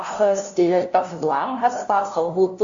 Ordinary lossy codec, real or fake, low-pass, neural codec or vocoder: Opus, 64 kbps; fake; 7.2 kHz; codec, 16 kHz, 0.5 kbps, FunCodec, trained on LibriTTS, 25 frames a second